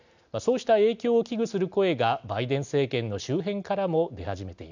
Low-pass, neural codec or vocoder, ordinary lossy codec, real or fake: 7.2 kHz; none; none; real